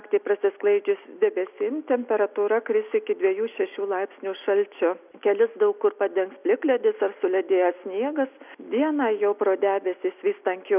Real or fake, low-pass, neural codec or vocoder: real; 3.6 kHz; none